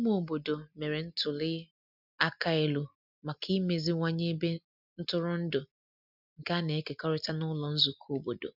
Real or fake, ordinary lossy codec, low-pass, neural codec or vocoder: real; none; 5.4 kHz; none